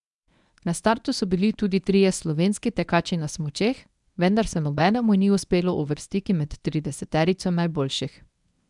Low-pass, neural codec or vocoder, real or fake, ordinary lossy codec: 10.8 kHz; codec, 24 kHz, 0.9 kbps, WavTokenizer, medium speech release version 1; fake; none